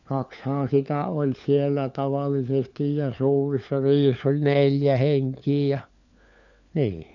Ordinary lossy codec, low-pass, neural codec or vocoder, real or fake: none; 7.2 kHz; codec, 16 kHz, 4 kbps, FunCodec, trained on LibriTTS, 50 frames a second; fake